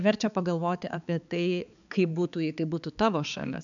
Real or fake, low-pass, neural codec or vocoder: fake; 7.2 kHz; codec, 16 kHz, 4 kbps, X-Codec, HuBERT features, trained on balanced general audio